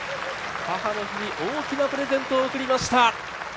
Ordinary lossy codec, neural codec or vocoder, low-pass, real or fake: none; none; none; real